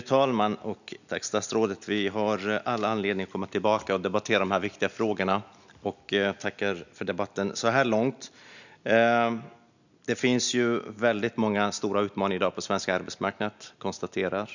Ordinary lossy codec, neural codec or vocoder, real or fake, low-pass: none; none; real; 7.2 kHz